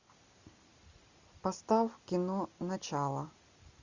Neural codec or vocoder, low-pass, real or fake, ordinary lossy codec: none; 7.2 kHz; real; Opus, 64 kbps